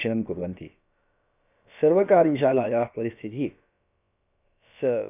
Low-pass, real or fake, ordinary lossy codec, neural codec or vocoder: 3.6 kHz; fake; none; codec, 16 kHz, about 1 kbps, DyCAST, with the encoder's durations